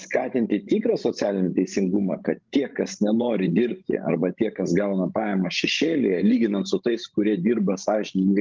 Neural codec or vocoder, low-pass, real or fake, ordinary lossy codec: codec, 16 kHz, 16 kbps, FreqCodec, larger model; 7.2 kHz; fake; Opus, 32 kbps